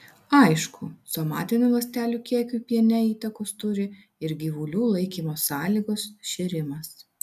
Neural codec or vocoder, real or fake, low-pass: none; real; 14.4 kHz